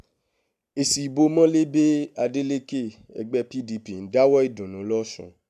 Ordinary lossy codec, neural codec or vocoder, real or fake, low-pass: none; none; real; 14.4 kHz